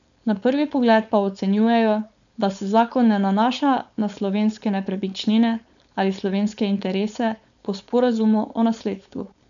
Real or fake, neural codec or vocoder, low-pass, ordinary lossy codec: fake; codec, 16 kHz, 4.8 kbps, FACodec; 7.2 kHz; none